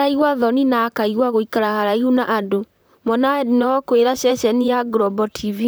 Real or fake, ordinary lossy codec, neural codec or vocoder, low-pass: fake; none; vocoder, 44.1 kHz, 128 mel bands, Pupu-Vocoder; none